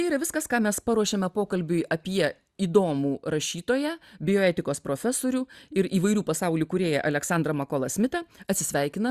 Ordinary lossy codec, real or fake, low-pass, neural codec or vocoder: Opus, 64 kbps; real; 14.4 kHz; none